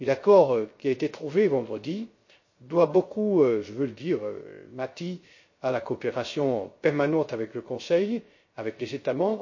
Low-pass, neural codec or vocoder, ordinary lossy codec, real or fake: 7.2 kHz; codec, 16 kHz, 0.3 kbps, FocalCodec; MP3, 32 kbps; fake